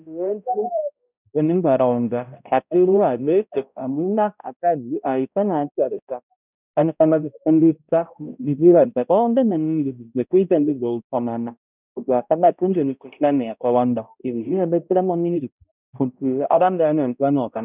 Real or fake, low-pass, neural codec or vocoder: fake; 3.6 kHz; codec, 16 kHz, 0.5 kbps, X-Codec, HuBERT features, trained on balanced general audio